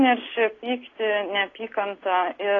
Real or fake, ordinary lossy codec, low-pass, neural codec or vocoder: real; AAC, 32 kbps; 7.2 kHz; none